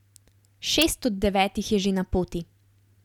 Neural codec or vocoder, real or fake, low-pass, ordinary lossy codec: none; real; 19.8 kHz; none